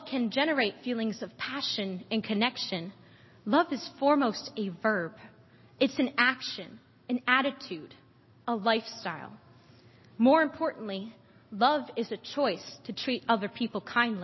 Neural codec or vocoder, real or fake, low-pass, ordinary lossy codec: none; real; 7.2 kHz; MP3, 24 kbps